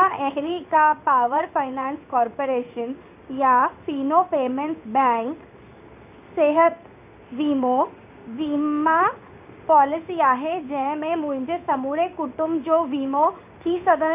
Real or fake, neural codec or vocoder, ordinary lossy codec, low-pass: fake; codec, 16 kHz in and 24 kHz out, 1 kbps, XY-Tokenizer; none; 3.6 kHz